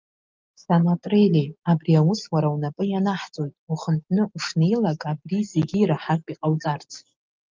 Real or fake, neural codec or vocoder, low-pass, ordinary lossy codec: real; none; 7.2 kHz; Opus, 24 kbps